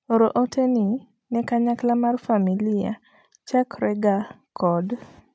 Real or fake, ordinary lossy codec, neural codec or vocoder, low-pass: real; none; none; none